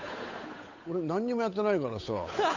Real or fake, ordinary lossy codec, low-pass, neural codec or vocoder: fake; AAC, 48 kbps; 7.2 kHz; codec, 16 kHz, 8 kbps, FunCodec, trained on Chinese and English, 25 frames a second